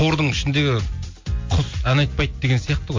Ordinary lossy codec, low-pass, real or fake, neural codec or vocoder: MP3, 48 kbps; 7.2 kHz; real; none